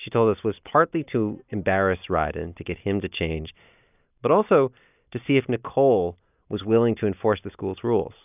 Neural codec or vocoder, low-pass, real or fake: none; 3.6 kHz; real